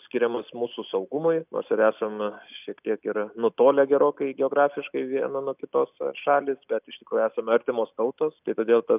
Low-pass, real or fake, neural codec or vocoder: 3.6 kHz; real; none